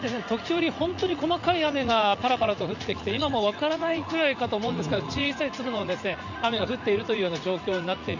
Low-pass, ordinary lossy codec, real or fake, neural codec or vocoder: 7.2 kHz; none; fake; vocoder, 44.1 kHz, 80 mel bands, Vocos